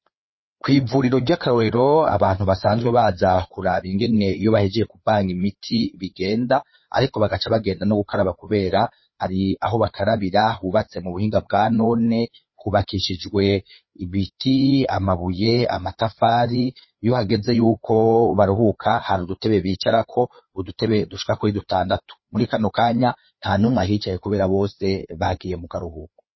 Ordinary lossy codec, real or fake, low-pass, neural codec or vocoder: MP3, 24 kbps; fake; 7.2 kHz; codec, 16 kHz, 4 kbps, FreqCodec, larger model